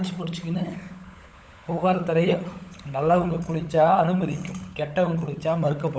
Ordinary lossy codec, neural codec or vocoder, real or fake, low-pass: none; codec, 16 kHz, 16 kbps, FunCodec, trained on LibriTTS, 50 frames a second; fake; none